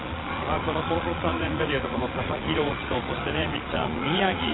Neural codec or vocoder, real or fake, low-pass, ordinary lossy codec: vocoder, 22.05 kHz, 80 mel bands, Vocos; fake; 7.2 kHz; AAC, 16 kbps